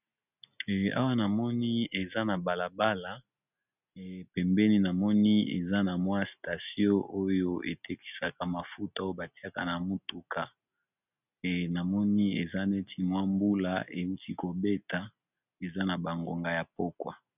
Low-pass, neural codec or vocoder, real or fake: 3.6 kHz; none; real